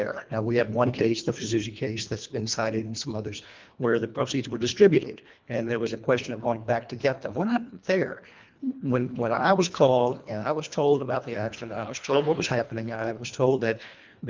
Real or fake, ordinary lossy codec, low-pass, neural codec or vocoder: fake; Opus, 24 kbps; 7.2 kHz; codec, 24 kHz, 1.5 kbps, HILCodec